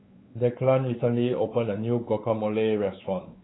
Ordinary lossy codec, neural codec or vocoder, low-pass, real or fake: AAC, 16 kbps; codec, 16 kHz, 4 kbps, X-Codec, WavLM features, trained on Multilingual LibriSpeech; 7.2 kHz; fake